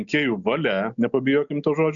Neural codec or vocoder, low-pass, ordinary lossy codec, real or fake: none; 7.2 kHz; MP3, 96 kbps; real